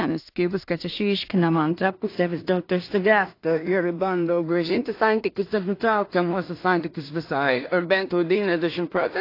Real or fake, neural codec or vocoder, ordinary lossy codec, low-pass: fake; codec, 16 kHz in and 24 kHz out, 0.4 kbps, LongCat-Audio-Codec, two codebook decoder; AAC, 32 kbps; 5.4 kHz